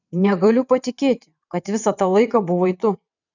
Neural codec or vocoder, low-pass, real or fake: vocoder, 22.05 kHz, 80 mel bands, WaveNeXt; 7.2 kHz; fake